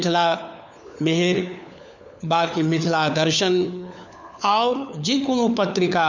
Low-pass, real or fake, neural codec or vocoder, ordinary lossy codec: 7.2 kHz; fake; codec, 16 kHz, 4 kbps, FunCodec, trained on LibriTTS, 50 frames a second; none